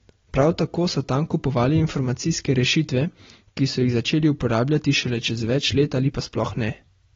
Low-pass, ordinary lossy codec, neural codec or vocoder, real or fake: 7.2 kHz; AAC, 24 kbps; none; real